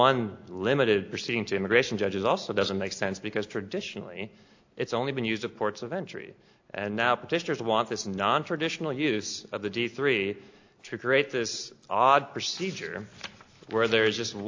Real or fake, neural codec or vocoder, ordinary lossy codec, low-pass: real; none; AAC, 48 kbps; 7.2 kHz